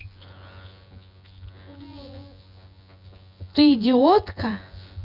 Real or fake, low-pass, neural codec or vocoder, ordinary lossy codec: fake; 5.4 kHz; vocoder, 24 kHz, 100 mel bands, Vocos; none